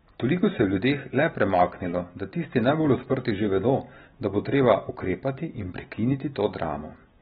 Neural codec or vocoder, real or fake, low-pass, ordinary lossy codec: none; real; 19.8 kHz; AAC, 16 kbps